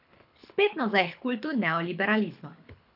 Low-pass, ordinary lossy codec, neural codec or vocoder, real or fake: 5.4 kHz; none; codec, 24 kHz, 6 kbps, HILCodec; fake